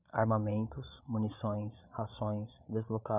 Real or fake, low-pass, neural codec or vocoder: fake; 3.6 kHz; codec, 16 kHz, 8 kbps, FreqCodec, larger model